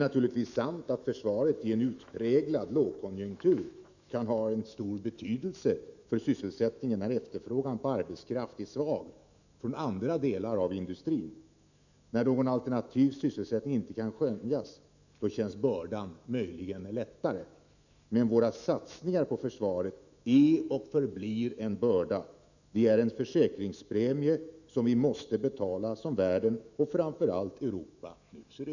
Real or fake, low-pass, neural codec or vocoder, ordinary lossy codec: fake; 7.2 kHz; autoencoder, 48 kHz, 128 numbers a frame, DAC-VAE, trained on Japanese speech; none